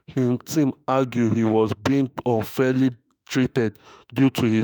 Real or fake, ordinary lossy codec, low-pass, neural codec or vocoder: fake; none; none; autoencoder, 48 kHz, 32 numbers a frame, DAC-VAE, trained on Japanese speech